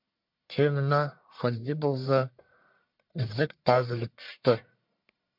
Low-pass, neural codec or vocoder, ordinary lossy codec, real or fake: 5.4 kHz; codec, 44.1 kHz, 1.7 kbps, Pupu-Codec; MP3, 48 kbps; fake